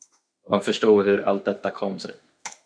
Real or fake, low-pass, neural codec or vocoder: fake; 9.9 kHz; autoencoder, 48 kHz, 32 numbers a frame, DAC-VAE, trained on Japanese speech